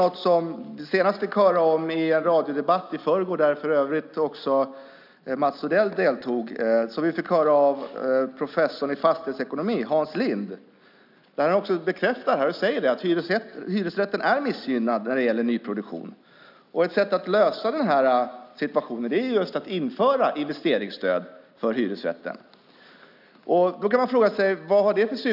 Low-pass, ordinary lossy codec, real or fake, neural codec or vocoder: 5.4 kHz; none; real; none